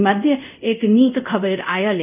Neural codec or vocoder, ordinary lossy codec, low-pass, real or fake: codec, 24 kHz, 0.5 kbps, DualCodec; none; 3.6 kHz; fake